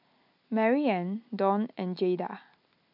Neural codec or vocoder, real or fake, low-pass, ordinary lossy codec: none; real; 5.4 kHz; none